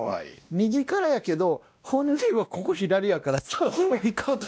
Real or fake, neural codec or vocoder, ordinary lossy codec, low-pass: fake; codec, 16 kHz, 1 kbps, X-Codec, WavLM features, trained on Multilingual LibriSpeech; none; none